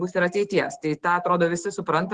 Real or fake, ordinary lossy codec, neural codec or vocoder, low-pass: fake; Opus, 16 kbps; autoencoder, 48 kHz, 128 numbers a frame, DAC-VAE, trained on Japanese speech; 10.8 kHz